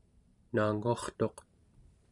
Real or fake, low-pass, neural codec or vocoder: fake; 10.8 kHz; vocoder, 44.1 kHz, 128 mel bands every 512 samples, BigVGAN v2